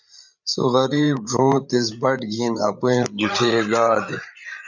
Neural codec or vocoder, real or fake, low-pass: codec, 16 kHz, 8 kbps, FreqCodec, larger model; fake; 7.2 kHz